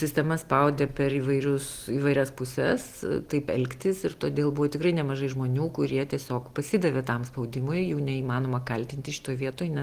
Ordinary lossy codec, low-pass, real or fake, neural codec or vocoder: Opus, 24 kbps; 14.4 kHz; real; none